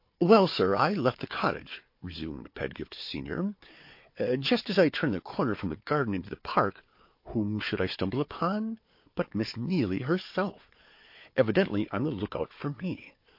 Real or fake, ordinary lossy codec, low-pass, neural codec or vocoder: fake; MP3, 32 kbps; 5.4 kHz; codec, 16 kHz, 4 kbps, FunCodec, trained on Chinese and English, 50 frames a second